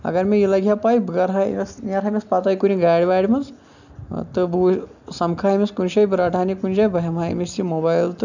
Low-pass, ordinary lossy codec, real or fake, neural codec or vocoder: 7.2 kHz; none; real; none